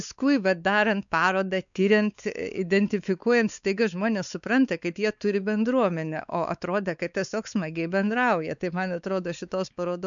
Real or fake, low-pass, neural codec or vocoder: fake; 7.2 kHz; codec, 16 kHz, 4 kbps, X-Codec, WavLM features, trained on Multilingual LibriSpeech